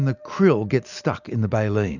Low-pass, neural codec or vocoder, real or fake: 7.2 kHz; none; real